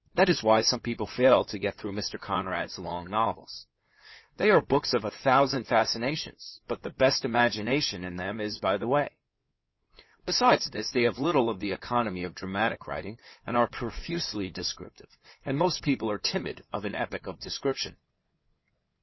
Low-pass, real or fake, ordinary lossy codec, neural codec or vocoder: 7.2 kHz; fake; MP3, 24 kbps; codec, 16 kHz in and 24 kHz out, 2.2 kbps, FireRedTTS-2 codec